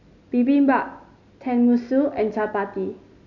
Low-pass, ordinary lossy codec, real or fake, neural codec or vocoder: 7.2 kHz; none; real; none